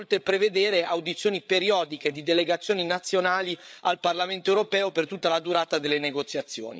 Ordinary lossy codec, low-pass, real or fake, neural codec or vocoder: none; none; fake; codec, 16 kHz, 8 kbps, FreqCodec, larger model